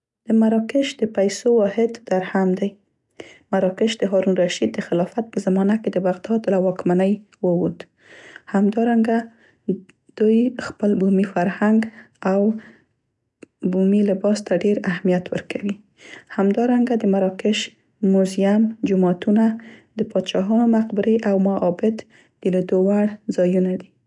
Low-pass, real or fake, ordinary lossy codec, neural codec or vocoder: 10.8 kHz; real; none; none